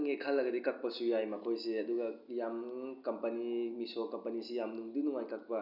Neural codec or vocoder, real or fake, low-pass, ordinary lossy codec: none; real; 5.4 kHz; none